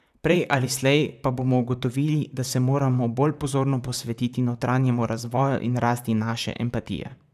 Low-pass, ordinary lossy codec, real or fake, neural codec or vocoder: 14.4 kHz; none; fake; vocoder, 44.1 kHz, 128 mel bands, Pupu-Vocoder